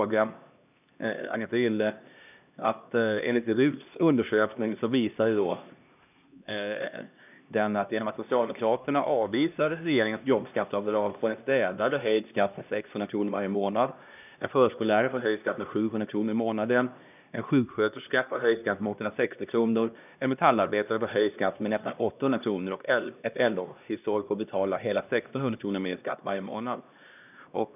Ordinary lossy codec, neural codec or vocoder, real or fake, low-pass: none; codec, 16 kHz, 1 kbps, X-Codec, HuBERT features, trained on LibriSpeech; fake; 3.6 kHz